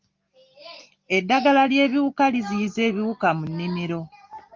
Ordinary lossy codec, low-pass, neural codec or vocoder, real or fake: Opus, 32 kbps; 7.2 kHz; none; real